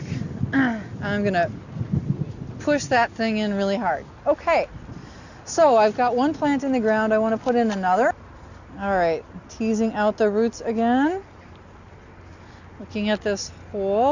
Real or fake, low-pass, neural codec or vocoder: real; 7.2 kHz; none